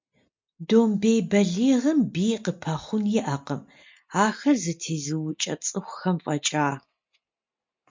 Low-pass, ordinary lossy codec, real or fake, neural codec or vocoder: 7.2 kHz; MP3, 64 kbps; real; none